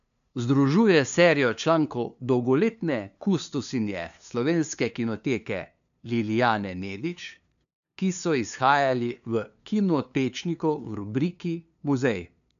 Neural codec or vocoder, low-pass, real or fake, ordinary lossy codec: codec, 16 kHz, 2 kbps, FunCodec, trained on LibriTTS, 25 frames a second; 7.2 kHz; fake; none